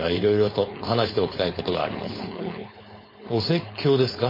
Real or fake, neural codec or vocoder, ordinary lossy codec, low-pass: fake; codec, 16 kHz, 4.8 kbps, FACodec; MP3, 24 kbps; 5.4 kHz